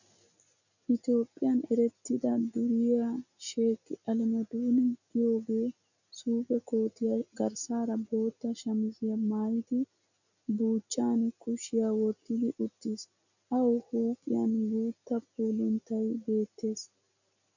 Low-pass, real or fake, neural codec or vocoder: 7.2 kHz; real; none